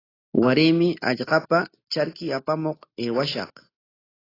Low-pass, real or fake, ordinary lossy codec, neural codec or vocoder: 5.4 kHz; real; AAC, 24 kbps; none